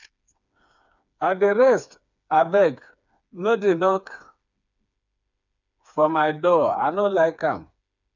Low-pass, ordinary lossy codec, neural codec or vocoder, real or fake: 7.2 kHz; none; codec, 16 kHz, 4 kbps, FreqCodec, smaller model; fake